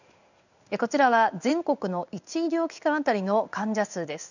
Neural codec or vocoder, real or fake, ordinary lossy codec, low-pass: codec, 16 kHz in and 24 kHz out, 1 kbps, XY-Tokenizer; fake; none; 7.2 kHz